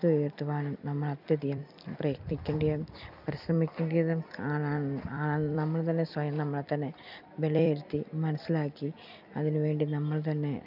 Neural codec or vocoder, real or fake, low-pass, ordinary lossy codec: vocoder, 44.1 kHz, 128 mel bands every 256 samples, BigVGAN v2; fake; 5.4 kHz; none